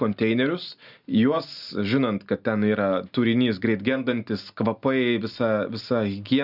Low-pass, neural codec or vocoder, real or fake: 5.4 kHz; none; real